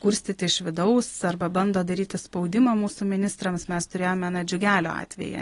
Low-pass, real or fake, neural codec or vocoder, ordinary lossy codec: 10.8 kHz; real; none; AAC, 32 kbps